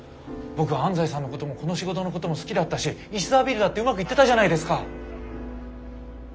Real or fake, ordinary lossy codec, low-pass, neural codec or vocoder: real; none; none; none